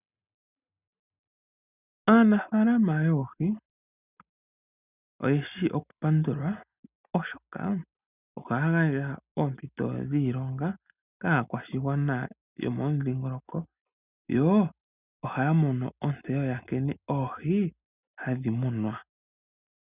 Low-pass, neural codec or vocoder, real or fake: 3.6 kHz; none; real